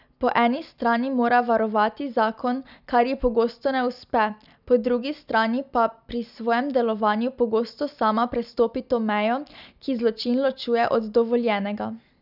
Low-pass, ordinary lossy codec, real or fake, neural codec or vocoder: 5.4 kHz; none; real; none